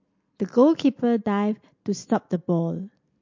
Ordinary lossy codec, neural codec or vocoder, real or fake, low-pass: MP3, 48 kbps; none; real; 7.2 kHz